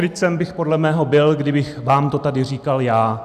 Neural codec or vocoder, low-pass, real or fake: none; 14.4 kHz; real